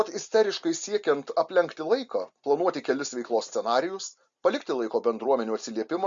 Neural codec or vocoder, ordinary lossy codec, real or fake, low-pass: none; Opus, 64 kbps; real; 7.2 kHz